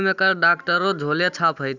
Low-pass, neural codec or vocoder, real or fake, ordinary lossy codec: 7.2 kHz; none; real; none